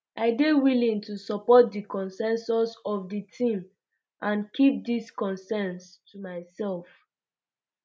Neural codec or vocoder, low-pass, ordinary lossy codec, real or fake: none; none; none; real